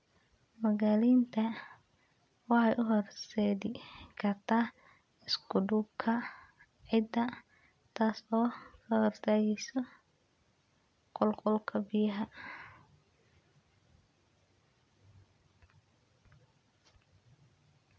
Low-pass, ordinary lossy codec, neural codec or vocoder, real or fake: none; none; none; real